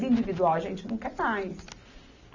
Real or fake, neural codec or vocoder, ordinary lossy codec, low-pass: real; none; none; 7.2 kHz